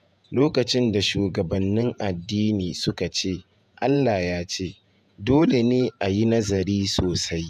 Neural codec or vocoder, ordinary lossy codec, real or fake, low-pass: vocoder, 48 kHz, 128 mel bands, Vocos; none; fake; 14.4 kHz